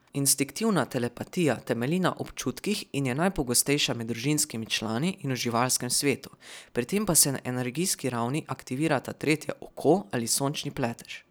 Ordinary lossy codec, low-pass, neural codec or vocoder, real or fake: none; none; none; real